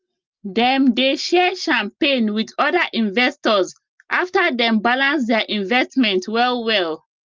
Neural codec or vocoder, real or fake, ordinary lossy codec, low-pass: none; real; Opus, 24 kbps; 7.2 kHz